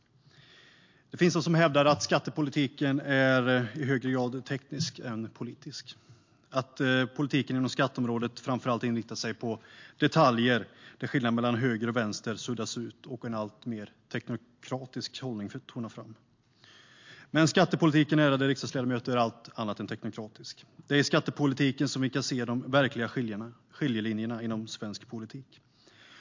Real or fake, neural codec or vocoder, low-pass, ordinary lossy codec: real; none; 7.2 kHz; MP3, 48 kbps